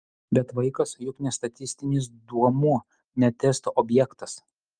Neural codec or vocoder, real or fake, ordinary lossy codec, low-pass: none; real; Opus, 32 kbps; 9.9 kHz